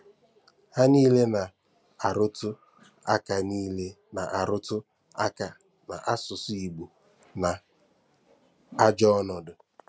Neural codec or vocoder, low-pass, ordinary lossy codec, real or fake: none; none; none; real